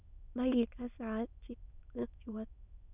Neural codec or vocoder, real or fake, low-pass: autoencoder, 22.05 kHz, a latent of 192 numbers a frame, VITS, trained on many speakers; fake; 3.6 kHz